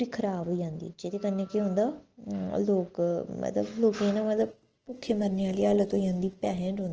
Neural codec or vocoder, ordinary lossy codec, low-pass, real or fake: none; Opus, 16 kbps; 7.2 kHz; real